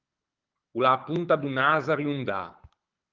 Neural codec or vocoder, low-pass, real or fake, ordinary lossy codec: codec, 24 kHz, 6 kbps, HILCodec; 7.2 kHz; fake; Opus, 32 kbps